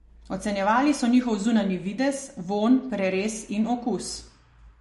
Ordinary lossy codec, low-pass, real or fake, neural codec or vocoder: MP3, 48 kbps; 14.4 kHz; real; none